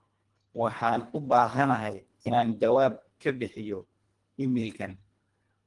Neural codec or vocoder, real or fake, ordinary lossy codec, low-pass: codec, 24 kHz, 1.5 kbps, HILCodec; fake; Opus, 24 kbps; 10.8 kHz